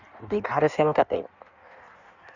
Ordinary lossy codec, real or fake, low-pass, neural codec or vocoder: none; fake; 7.2 kHz; codec, 16 kHz in and 24 kHz out, 1.1 kbps, FireRedTTS-2 codec